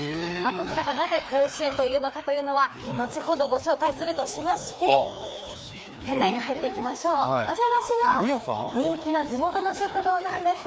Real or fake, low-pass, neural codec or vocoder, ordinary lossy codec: fake; none; codec, 16 kHz, 2 kbps, FreqCodec, larger model; none